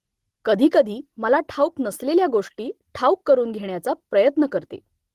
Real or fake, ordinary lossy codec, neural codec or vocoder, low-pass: real; Opus, 16 kbps; none; 14.4 kHz